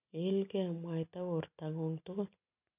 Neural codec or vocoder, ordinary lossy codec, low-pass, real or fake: none; none; 3.6 kHz; real